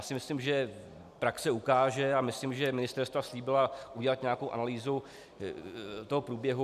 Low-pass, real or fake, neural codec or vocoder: 14.4 kHz; real; none